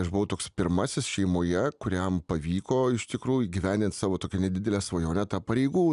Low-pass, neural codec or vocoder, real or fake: 10.8 kHz; none; real